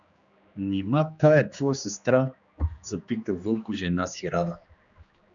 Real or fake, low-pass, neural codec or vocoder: fake; 7.2 kHz; codec, 16 kHz, 2 kbps, X-Codec, HuBERT features, trained on general audio